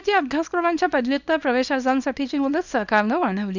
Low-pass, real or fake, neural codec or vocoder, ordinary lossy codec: 7.2 kHz; fake; codec, 24 kHz, 0.9 kbps, WavTokenizer, small release; none